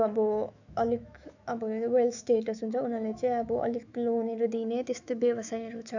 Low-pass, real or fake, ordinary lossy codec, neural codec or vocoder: 7.2 kHz; fake; none; autoencoder, 48 kHz, 128 numbers a frame, DAC-VAE, trained on Japanese speech